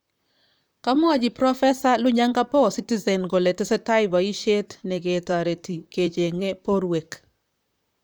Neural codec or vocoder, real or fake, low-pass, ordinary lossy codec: vocoder, 44.1 kHz, 128 mel bands, Pupu-Vocoder; fake; none; none